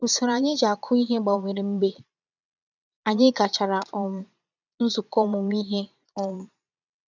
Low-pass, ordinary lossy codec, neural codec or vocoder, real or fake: 7.2 kHz; none; vocoder, 22.05 kHz, 80 mel bands, Vocos; fake